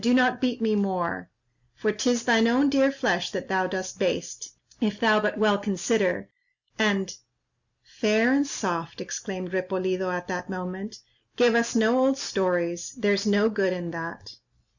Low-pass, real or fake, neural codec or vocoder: 7.2 kHz; real; none